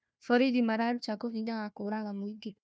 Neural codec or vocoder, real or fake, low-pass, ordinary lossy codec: codec, 16 kHz, 1 kbps, FunCodec, trained on Chinese and English, 50 frames a second; fake; none; none